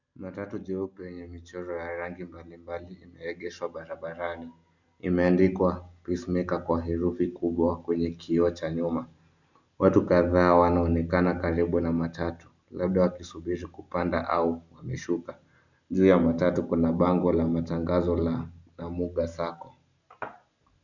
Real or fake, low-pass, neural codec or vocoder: real; 7.2 kHz; none